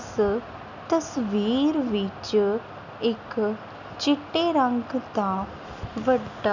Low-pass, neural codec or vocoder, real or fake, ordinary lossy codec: 7.2 kHz; none; real; none